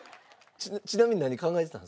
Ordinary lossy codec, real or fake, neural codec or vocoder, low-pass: none; real; none; none